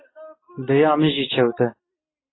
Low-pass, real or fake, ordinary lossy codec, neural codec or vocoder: 7.2 kHz; real; AAC, 16 kbps; none